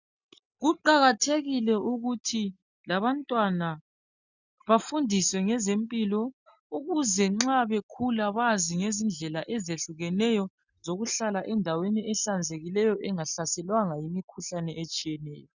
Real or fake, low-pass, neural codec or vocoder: real; 7.2 kHz; none